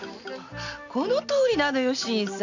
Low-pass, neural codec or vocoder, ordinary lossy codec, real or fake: 7.2 kHz; none; none; real